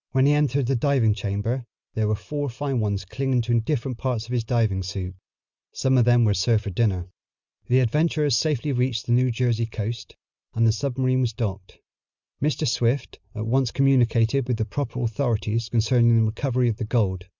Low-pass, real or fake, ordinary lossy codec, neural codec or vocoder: 7.2 kHz; real; Opus, 64 kbps; none